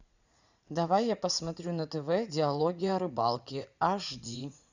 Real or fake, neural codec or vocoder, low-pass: fake; vocoder, 44.1 kHz, 80 mel bands, Vocos; 7.2 kHz